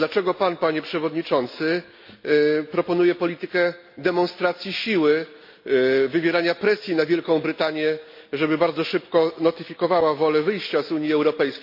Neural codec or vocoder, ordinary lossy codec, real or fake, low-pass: none; MP3, 32 kbps; real; 5.4 kHz